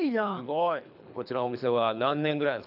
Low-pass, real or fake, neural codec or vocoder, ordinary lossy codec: 5.4 kHz; fake; codec, 24 kHz, 3 kbps, HILCodec; none